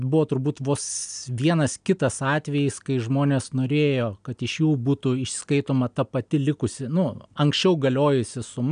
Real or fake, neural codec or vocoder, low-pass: real; none; 9.9 kHz